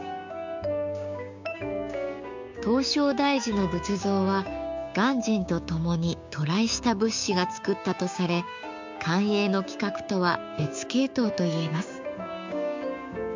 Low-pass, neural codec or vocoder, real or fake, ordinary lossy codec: 7.2 kHz; codec, 16 kHz, 6 kbps, DAC; fake; MP3, 64 kbps